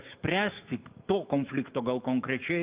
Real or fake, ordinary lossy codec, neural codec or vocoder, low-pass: fake; Opus, 32 kbps; autoencoder, 48 kHz, 128 numbers a frame, DAC-VAE, trained on Japanese speech; 3.6 kHz